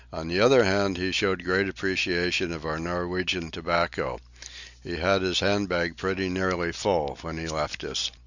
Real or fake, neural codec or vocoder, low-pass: real; none; 7.2 kHz